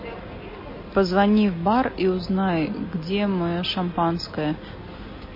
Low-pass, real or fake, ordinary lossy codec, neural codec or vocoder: 5.4 kHz; real; MP3, 24 kbps; none